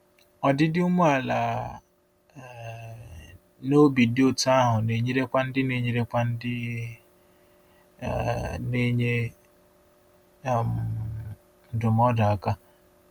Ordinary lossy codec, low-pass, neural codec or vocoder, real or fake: none; 19.8 kHz; none; real